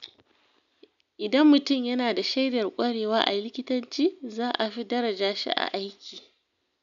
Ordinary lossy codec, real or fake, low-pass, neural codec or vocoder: none; real; 7.2 kHz; none